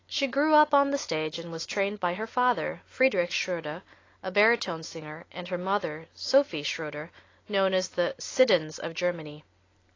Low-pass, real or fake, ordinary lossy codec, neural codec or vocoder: 7.2 kHz; real; AAC, 32 kbps; none